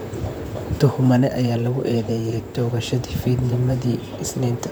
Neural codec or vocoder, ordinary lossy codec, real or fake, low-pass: vocoder, 44.1 kHz, 128 mel bands, Pupu-Vocoder; none; fake; none